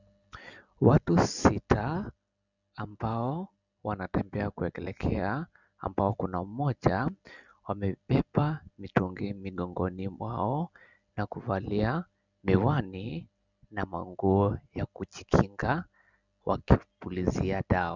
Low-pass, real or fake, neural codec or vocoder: 7.2 kHz; real; none